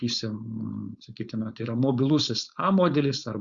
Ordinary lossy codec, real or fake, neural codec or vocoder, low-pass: Opus, 64 kbps; fake; codec, 16 kHz, 4.8 kbps, FACodec; 7.2 kHz